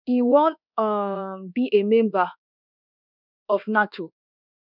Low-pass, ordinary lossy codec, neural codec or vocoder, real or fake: 5.4 kHz; none; codec, 24 kHz, 1.2 kbps, DualCodec; fake